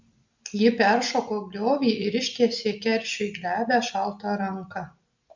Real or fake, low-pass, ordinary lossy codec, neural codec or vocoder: real; 7.2 kHz; MP3, 64 kbps; none